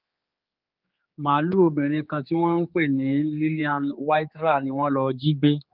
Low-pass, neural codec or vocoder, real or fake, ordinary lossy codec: 5.4 kHz; codec, 16 kHz, 4 kbps, X-Codec, HuBERT features, trained on general audio; fake; Opus, 24 kbps